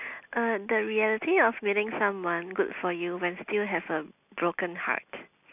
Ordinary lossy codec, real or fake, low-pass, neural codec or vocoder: none; real; 3.6 kHz; none